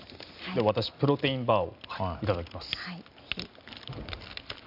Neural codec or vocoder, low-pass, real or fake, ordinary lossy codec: none; 5.4 kHz; real; none